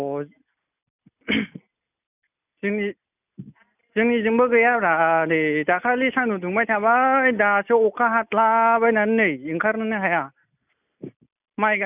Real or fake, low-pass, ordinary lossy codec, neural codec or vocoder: real; 3.6 kHz; none; none